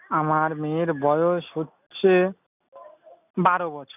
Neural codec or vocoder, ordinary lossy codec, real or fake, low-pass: none; none; real; 3.6 kHz